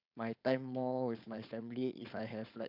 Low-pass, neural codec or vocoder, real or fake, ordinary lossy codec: 5.4 kHz; codec, 16 kHz, 4.8 kbps, FACodec; fake; AAC, 48 kbps